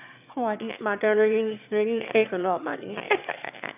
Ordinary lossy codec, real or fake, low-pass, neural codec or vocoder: none; fake; 3.6 kHz; autoencoder, 22.05 kHz, a latent of 192 numbers a frame, VITS, trained on one speaker